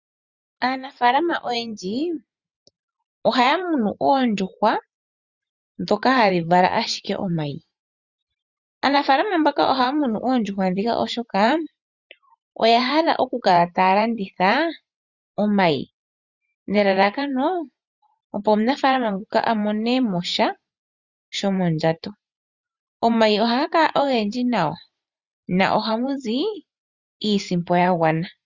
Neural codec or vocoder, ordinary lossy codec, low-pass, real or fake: vocoder, 44.1 kHz, 128 mel bands every 512 samples, BigVGAN v2; Opus, 64 kbps; 7.2 kHz; fake